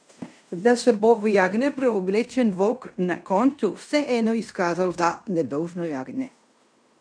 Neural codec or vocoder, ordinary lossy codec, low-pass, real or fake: codec, 16 kHz in and 24 kHz out, 0.9 kbps, LongCat-Audio-Codec, fine tuned four codebook decoder; none; 9.9 kHz; fake